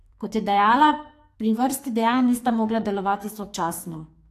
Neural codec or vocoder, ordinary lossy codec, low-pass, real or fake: codec, 44.1 kHz, 2.6 kbps, SNAC; AAC, 64 kbps; 14.4 kHz; fake